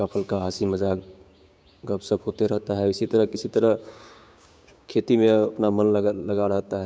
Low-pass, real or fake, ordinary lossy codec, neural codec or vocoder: none; fake; none; codec, 16 kHz, 6 kbps, DAC